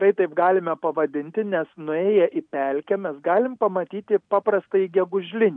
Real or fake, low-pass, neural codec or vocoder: real; 9.9 kHz; none